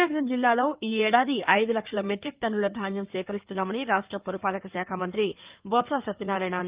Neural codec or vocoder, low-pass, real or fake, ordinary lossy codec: codec, 16 kHz in and 24 kHz out, 2.2 kbps, FireRedTTS-2 codec; 3.6 kHz; fake; Opus, 32 kbps